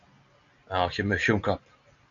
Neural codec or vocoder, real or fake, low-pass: none; real; 7.2 kHz